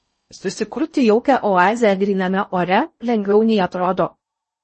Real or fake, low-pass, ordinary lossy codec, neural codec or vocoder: fake; 10.8 kHz; MP3, 32 kbps; codec, 16 kHz in and 24 kHz out, 0.6 kbps, FocalCodec, streaming, 4096 codes